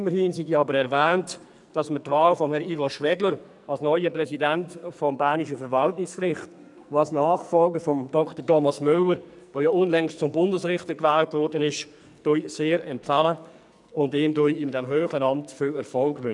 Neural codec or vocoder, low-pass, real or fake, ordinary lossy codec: codec, 44.1 kHz, 2.6 kbps, SNAC; 10.8 kHz; fake; none